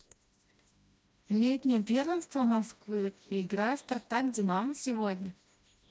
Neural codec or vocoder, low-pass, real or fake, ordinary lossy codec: codec, 16 kHz, 1 kbps, FreqCodec, smaller model; none; fake; none